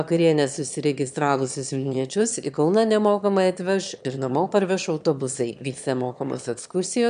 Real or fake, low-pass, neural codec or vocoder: fake; 9.9 kHz; autoencoder, 22.05 kHz, a latent of 192 numbers a frame, VITS, trained on one speaker